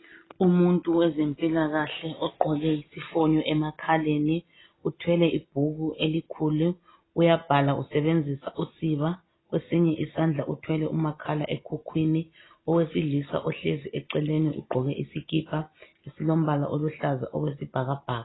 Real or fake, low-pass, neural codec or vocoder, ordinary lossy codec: real; 7.2 kHz; none; AAC, 16 kbps